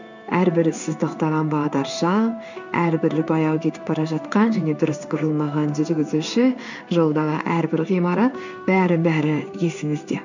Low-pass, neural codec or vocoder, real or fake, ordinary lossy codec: 7.2 kHz; codec, 16 kHz in and 24 kHz out, 1 kbps, XY-Tokenizer; fake; none